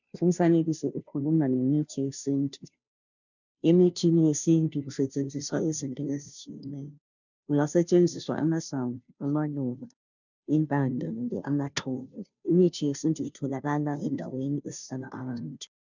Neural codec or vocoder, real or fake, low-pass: codec, 16 kHz, 0.5 kbps, FunCodec, trained on Chinese and English, 25 frames a second; fake; 7.2 kHz